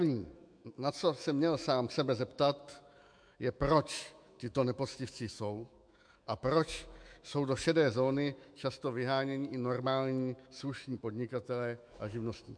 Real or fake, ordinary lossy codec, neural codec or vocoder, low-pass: fake; MP3, 64 kbps; autoencoder, 48 kHz, 128 numbers a frame, DAC-VAE, trained on Japanese speech; 9.9 kHz